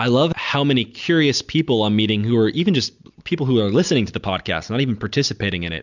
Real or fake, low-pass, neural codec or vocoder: real; 7.2 kHz; none